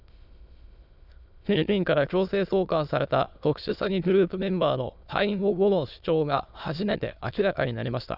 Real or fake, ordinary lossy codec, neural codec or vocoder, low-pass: fake; none; autoencoder, 22.05 kHz, a latent of 192 numbers a frame, VITS, trained on many speakers; 5.4 kHz